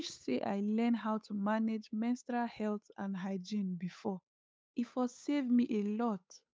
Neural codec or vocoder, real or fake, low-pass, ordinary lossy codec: codec, 16 kHz, 8 kbps, FunCodec, trained on Chinese and English, 25 frames a second; fake; none; none